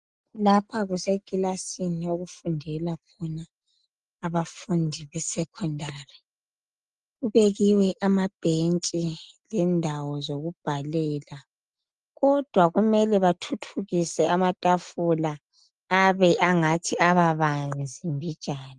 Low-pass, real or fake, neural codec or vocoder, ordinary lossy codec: 10.8 kHz; real; none; Opus, 24 kbps